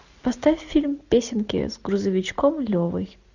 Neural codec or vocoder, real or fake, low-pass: none; real; 7.2 kHz